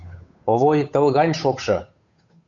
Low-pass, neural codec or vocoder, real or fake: 7.2 kHz; codec, 16 kHz, 8 kbps, FunCodec, trained on Chinese and English, 25 frames a second; fake